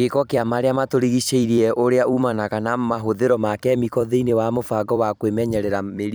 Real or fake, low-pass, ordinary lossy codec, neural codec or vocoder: fake; none; none; vocoder, 44.1 kHz, 128 mel bands every 256 samples, BigVGAN v2